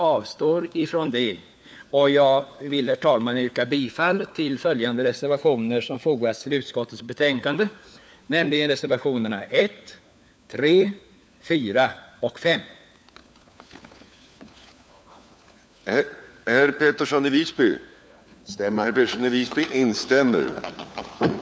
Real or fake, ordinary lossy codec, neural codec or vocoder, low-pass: fake; none; codec, 16 kHz, 4 kbps, FunCodec, trained on LibriTTS, 50 frames a second; none